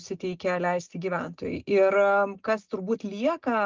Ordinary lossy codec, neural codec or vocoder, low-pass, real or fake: Opus, 32 kbps; none; 7.2 kHz; real